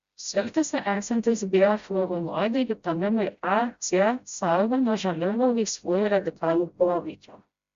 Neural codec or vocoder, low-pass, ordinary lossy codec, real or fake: codec, 16 kHz, 0.5 kbps, FreqCodec, smaller model; 7.2 kHz; Opus, 64 kbps; fake